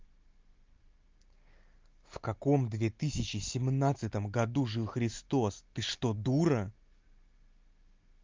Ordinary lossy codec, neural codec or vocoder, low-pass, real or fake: Opus, 24 kbps; none; 7.2 kHz; real